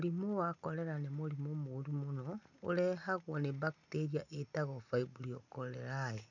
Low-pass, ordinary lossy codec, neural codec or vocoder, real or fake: 7.2 kHz; none; none; real